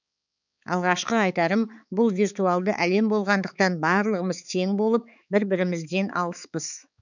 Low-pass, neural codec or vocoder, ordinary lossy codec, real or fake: 7.2 kHz; codec, 16 kHz, 4 kbps, X-Codec, HuBERT features, trained on balanced general audio; none; fake